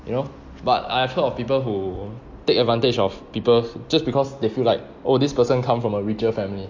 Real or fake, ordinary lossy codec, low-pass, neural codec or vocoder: real; MP3, 48 kbps; 7.2 kHz; none